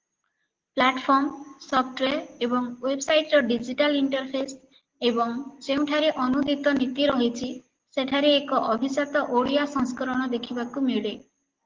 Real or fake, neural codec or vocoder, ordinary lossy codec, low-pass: real; none; Opus, 16 kbps; 7.2 kHz